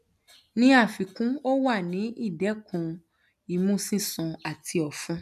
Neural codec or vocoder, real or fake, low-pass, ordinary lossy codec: none; real; 14.4 kHz; none